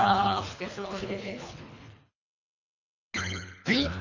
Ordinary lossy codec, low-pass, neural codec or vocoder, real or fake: none; 7.2 kHz; codec, 24 kHz, 3 kbps, HILCodec; fake